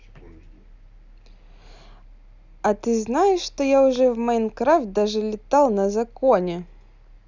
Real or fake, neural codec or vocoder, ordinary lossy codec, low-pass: real; none; none; 7.2 kHz